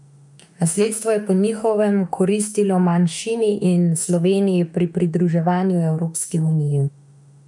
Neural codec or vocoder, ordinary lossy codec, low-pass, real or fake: autoencoder, 48 kHz, 32 numbers a frame, DAC-VAE, trained on Japanese speech; none; 10.8 kHz; fake